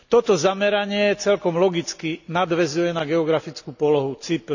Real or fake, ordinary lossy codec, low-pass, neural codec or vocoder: real; none; 7.2 kHz; none